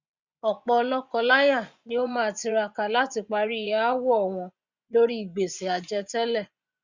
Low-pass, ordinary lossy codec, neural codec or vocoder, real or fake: 7.2 kHz; Opus, 64 kbps; vocoder, 24 kHz, 100 mel bands, Vocos; fake